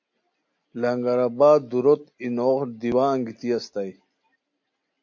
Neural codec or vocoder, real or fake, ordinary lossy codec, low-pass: none; real; MP3, 48 kbps; 7.2 kHz